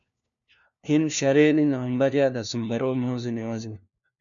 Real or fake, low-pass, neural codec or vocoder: fake; 7.2 kHz; codec, 16 kHz, 1 kbps, FunCodec, trained on LibriTTS, 50 frames a second